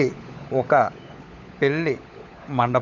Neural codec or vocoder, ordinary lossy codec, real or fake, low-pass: codec, 16 kHz, 16 kbps, FunCodec, trained on LibriTTS, 50 frames a second; none; fake; 7.2 kHz